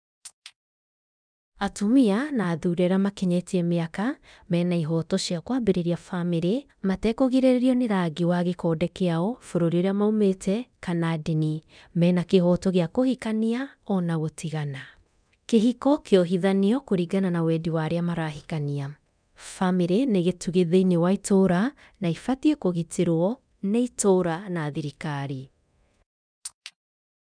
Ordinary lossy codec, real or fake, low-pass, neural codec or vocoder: none; fake; 9.9 kHz; codec, 24 kHz, 0.9 kbps, DualCodec